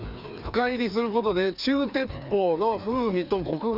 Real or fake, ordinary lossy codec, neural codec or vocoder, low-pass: fake; none; codec, 16 kHz, 2 kbps, FreqCodec, larger model; 5.4 kHz